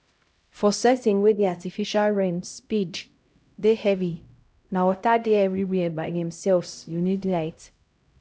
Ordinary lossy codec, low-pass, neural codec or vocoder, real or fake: none; none; codec, 16 kHz, 0.5 kbps, X-Codec, HuBERT features, trained on LibriSpeech; fake